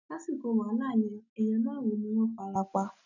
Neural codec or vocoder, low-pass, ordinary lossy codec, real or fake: none; 7.2 kHz; none; real